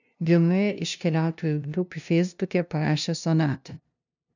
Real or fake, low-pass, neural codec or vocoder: fake; 7.2 kHz; codec, 16 kHz, 0.5 kbps, FunCodec, trained on LibriTTS, 25 frames a second